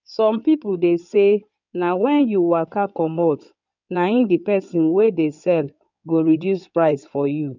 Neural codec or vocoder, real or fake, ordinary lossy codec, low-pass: codec, 16 kHz in and 24 kHz out, 2.2 kbps, FireRedTTS-2 codec; fake; none; 7.2 kHz